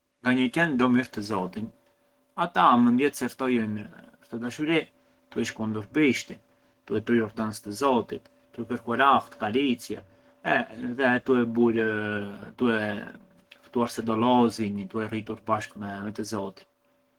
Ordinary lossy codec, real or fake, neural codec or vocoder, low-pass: Opus, 16 kbps; fake; codec, 44.1 kHz, 7.8 kbps, Pupu-Codec; 19.8 kHz